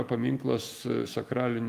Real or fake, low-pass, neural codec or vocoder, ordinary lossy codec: fake; 14.4 kHz; autoencoder, 48 kHz, 128 numbers a frame, DAC-VAE, trained on Japanese speech; Opus, 32 kbps